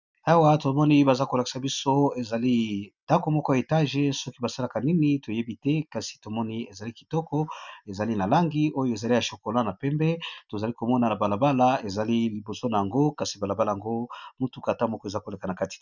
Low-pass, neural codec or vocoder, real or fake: 7.2 kHz; none; real